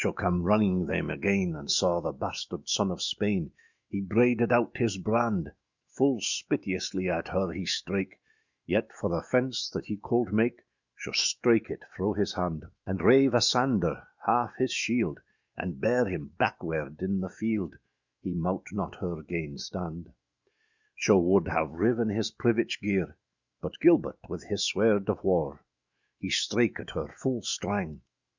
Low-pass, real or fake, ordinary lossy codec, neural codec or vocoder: 7.2 kHz; fake; Opus, 64 kbps; autoencoder, 48 kHz, 128 numbers a frame, DAC-VAE, trained on Japanese speech